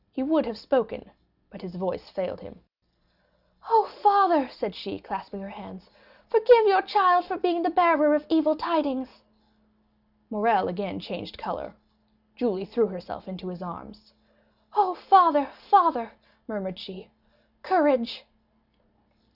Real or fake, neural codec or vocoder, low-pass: real; none; 5.4 kHz